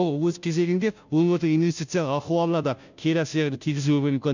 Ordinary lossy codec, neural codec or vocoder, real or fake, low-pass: none; codec, 16 kHz, 0.5 kbps, FunCodec, trained on Chinese and English, 25 frames a second; fake; 7.2 kHz